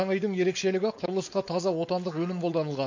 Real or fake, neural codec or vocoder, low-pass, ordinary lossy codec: fake; codec, 16 kHz, 4.8 kbps, FACodec; 7.2 kHz; MP3, 48 kbps